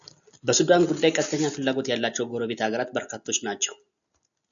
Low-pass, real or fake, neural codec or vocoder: 7.2 kHz; real; none